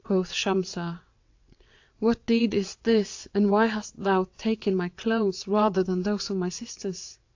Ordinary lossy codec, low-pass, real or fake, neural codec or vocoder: AAC, 48 kbps; 7.2 kHz; fake; vocoder, 22.05 kHz, 80 mel bands, WaveNeXt